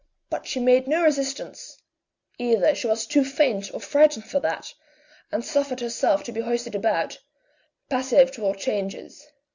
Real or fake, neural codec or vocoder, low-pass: real; none; 7.2 kHz